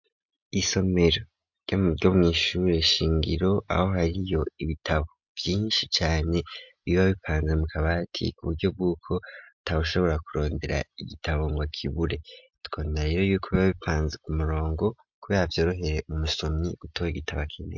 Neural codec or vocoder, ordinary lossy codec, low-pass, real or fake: none; MP3, 64 kbps; 7.2 kHz; real